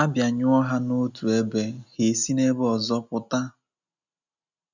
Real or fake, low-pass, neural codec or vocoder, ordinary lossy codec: real; 7.2 kHz; none; none